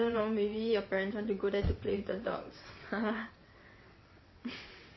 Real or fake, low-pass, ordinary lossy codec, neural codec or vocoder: fake; 7.2 kHz; MP3, 24 kbps; vocoder, 22.05 kHz, 80 mel bands, WaveNeXt